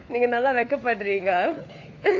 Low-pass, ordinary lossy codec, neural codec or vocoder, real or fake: 7.2 kHz; AAC, 48 kbps; codec, 16 kHz, 4 kbps, FunCodec, trained on LibriTTS, 50 frames a second; fake